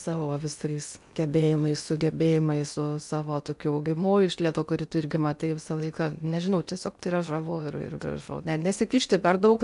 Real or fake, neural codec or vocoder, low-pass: fake; codec, 16 kHz in and 24 kHz out, 0.8 kbps, FocalCodec, streaming, 65536 codes; 10.8 kHz